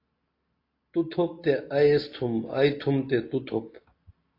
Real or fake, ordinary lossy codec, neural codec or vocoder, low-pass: real; AAC, 32 kbps; none; 5.4 kHz